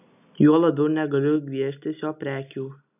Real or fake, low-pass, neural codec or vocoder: real; 3.6 kHz; none